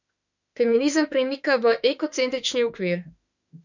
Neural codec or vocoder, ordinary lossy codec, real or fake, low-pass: autoencoder, 48 kHz, 32 numbers a frame, DAC-VAE, trained on Japanese speech; none; fake; 7.2 kHz